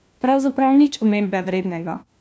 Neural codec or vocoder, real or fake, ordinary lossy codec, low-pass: codec, 16 kHz, 1 kbps, FunCodec, trained on LibriTTS, 50 frames a second; fake; none; none